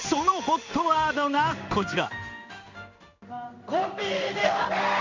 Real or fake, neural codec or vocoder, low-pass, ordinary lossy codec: fake; codec, 16 kHz in and 24 kHz out, 1 kbps, XY-Tokenizer; 7.2 kHz; MP3, 64 kbps